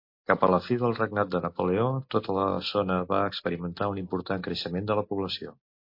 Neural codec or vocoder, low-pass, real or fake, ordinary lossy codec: none; 5.4 kHz; real; MP3, 32 kbps